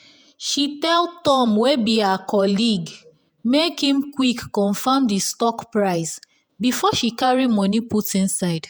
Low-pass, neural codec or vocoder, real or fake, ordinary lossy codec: none; vocoder, 48 kHz, 128 mel bands, Vocos; fake; none